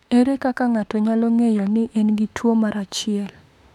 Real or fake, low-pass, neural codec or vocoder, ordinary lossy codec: fake; 19.8 kHz; autoencoder, 48 kHz, 32 numbers a frame, DAC-VAE, trained on Japanese speech; none